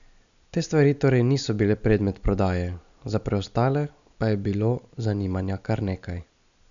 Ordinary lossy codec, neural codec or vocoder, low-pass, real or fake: none; none; 7.2 kHz; real